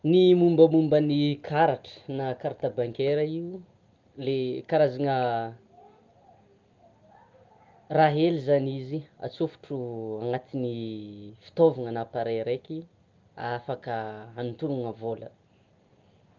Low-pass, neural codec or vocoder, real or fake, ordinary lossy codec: 7.2 kHz; none; real; Opus, 32 kbps